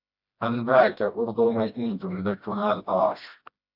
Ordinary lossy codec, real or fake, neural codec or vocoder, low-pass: AAC, 48 kbps; fake; codec, 16 kHz, 1 kbps, FreqCodec, smaller model; 5.4 kHz